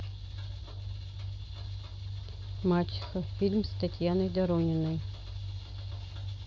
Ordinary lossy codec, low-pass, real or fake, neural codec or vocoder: none; none; real; none